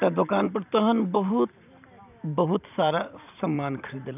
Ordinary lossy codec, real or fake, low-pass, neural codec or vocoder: none; fake; 3.6 kHz; vocoder, 44.1 kHz, 128 mel bands every 512 samples, BigVGAN v2